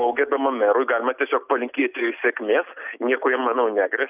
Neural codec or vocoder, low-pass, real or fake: none; 3.6 kHz; real